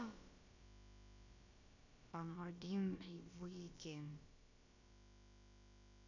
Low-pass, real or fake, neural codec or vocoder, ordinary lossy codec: 7.2 kHz; fake; codec, 16 kHz, about 1 kbps, DyCAST, with the encoder's durations; none